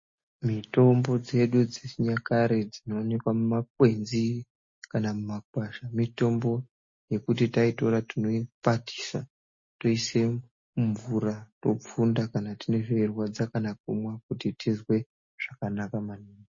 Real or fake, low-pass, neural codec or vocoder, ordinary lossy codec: real; 7.2 kHz; none; MP3, 32 kbps